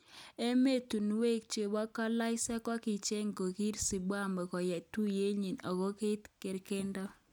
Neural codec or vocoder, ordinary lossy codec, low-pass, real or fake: none; none; none; real